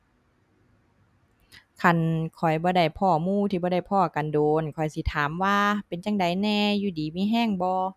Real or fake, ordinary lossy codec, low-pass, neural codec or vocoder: real; none; 14.4 kHz; none